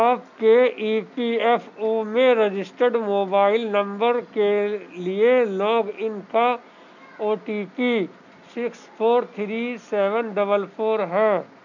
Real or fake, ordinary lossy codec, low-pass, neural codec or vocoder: real; none; 7.2 kHz; none